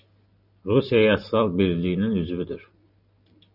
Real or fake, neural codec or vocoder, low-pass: real; none; 5.4 kHz